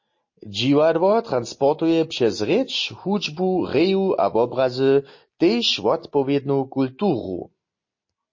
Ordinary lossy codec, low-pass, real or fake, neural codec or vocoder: MP3, 32 kbps; 7.2 kHz; real; none